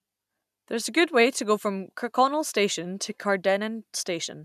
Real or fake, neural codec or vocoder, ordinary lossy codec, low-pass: real; none; none; 14.4 kHz